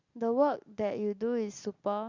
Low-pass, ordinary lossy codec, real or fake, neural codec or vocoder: 7.2 kHz; Opus, 64 kbps; real; none